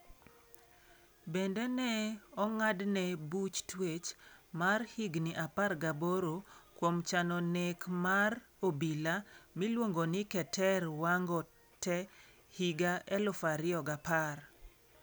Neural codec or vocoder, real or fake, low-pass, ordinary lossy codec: none; real; none; none